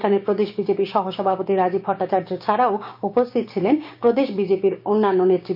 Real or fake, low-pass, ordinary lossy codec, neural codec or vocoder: fake; 5.4 kHz; none; codec, 16 kHz, 6 kbps, DAC